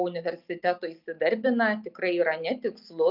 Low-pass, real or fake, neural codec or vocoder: 5.4 kHz; real; none